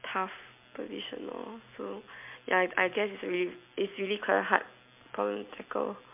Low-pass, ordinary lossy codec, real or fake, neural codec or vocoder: 3.6 kHz; MP3, 32 kbps; real; none